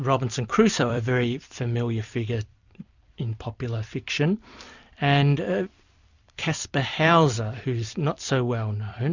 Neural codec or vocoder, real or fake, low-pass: vocoder, 44.1 kHz, 128 mel bands every 256 samples, BigVGAN v2; fake; 7.2 kHz